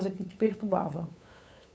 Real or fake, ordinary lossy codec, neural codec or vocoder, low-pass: fake; none; codec, 16 kHz, 8 kbps, FunCodec, trained on LibriTTS, 25 frames a second; none